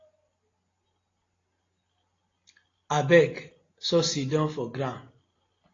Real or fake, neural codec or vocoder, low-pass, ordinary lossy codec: real; none; 7.2 kHz; AAC, 32 kbps